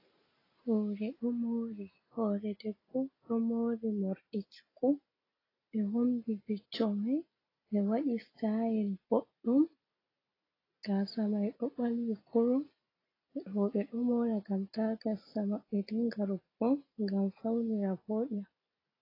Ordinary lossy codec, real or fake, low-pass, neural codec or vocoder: AAC, 24 kbps; real; 5.4 kHz; none